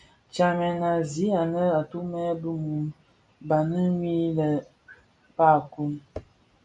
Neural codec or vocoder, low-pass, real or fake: none; 9.9 kHz; real